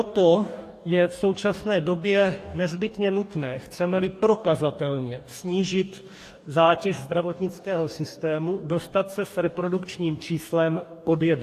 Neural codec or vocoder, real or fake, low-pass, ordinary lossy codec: codec, 44.1 kHz, 2.6 kbps, DAC; fake; 14.4 kHz; AAC, 64 kbps